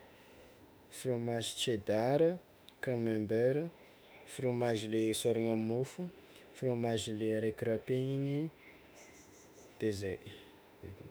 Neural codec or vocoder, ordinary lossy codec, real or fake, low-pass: autoencoder, 48 kHz, 32 numbers a frame, DAC-VAE, trained on Japanese speech; none; fake; none